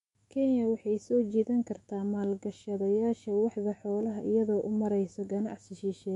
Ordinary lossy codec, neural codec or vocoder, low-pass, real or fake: MP3, 48 kbps; autoencoder, 48 kHz, 128 numbers a frame, DAC-VAE, trained on Japanese speech; 14.4 kHz; fake